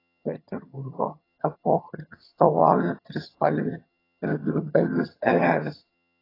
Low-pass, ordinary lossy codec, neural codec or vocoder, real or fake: 5.4 kHz; AAC, 24 kbps; vocoder, 22.05 kHz, 80 mel bands, HiFi-GAN; fake